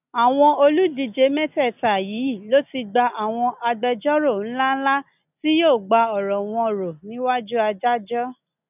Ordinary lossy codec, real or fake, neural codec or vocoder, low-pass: none; real; none; 3.6 kHz